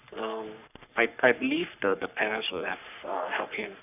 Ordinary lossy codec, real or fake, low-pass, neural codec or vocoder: none; fake; 3.6 kHz; codec, 44.1 kHz, 3.4 kbps, Pupu-Codec